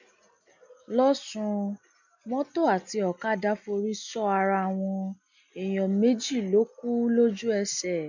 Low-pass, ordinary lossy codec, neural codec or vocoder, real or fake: 7.2 kHz; none; none; real